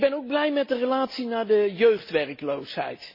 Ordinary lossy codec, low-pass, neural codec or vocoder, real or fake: MP3, 24 kbps; 5.4 kHz; none; real